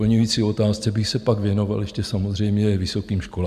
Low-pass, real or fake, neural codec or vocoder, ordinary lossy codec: 14.4 kHz; real; none; MP3, 96 kbps